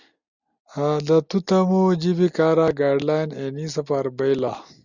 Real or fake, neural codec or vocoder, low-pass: real; none; 7.2 kHz